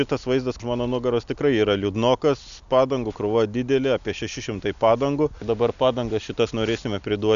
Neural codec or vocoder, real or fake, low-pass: none; real; 7.2 kHz